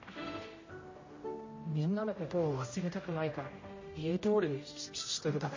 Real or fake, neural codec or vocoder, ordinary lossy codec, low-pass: fake; codec, 16 kHz, 0.5 kbps, X-Codec, HuBERT features, trained on general audio; MP3, 32 kbps; 7.2 kHz